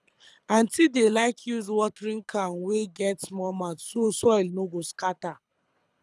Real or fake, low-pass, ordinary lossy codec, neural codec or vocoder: fake; none; none; codec, 24 kHz, 6 kbps, HILCodec